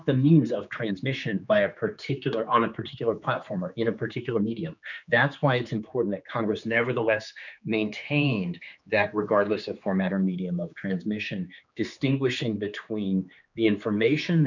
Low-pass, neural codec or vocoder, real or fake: 7.2 kHz; codec, 16 kHz, 4 kbps, X-Codec, HuBERT features, trained on general audio; fake